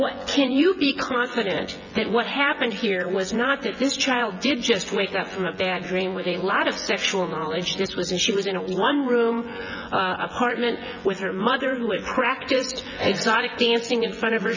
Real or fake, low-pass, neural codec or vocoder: fake; 7.2 kHz; vocoder, 24 kHz, 100 mel bands, Vocos